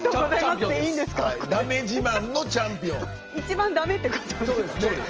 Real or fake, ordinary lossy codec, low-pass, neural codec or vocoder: real; Opus, 24 kbps; 7.2 kHz; none